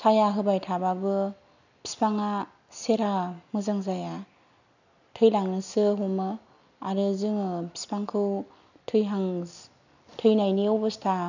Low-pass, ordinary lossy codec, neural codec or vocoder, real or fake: 7.2 kHz; none; none; real